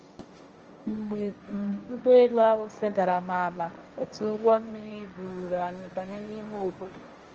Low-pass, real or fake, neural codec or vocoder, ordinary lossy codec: 7.2 kHz; fake; codec, 16 kHz, 1.1 kbps, Voila-Tokenizer; Opus, 24 kbps